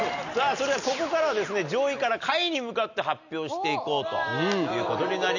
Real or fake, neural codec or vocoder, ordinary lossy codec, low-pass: real; none; none; 7.2 kHz